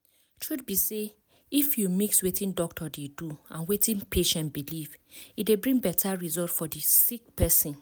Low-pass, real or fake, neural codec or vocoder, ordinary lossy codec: none; real; none; none